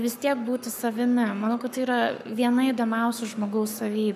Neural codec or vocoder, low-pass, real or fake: codec, 44.1 kHz, 7.8 kbps, Pupu-Codec; 14.4 kHz; fake